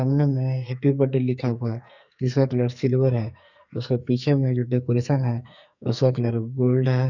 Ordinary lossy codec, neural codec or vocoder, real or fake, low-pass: none; codec, 44.1 kHz, 2.6 kbps, SNAC; fake; 7.2 kHz